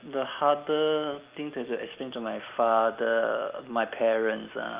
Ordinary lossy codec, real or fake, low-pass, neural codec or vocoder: Opus, 24 kbps; real; 3.6 kHz; none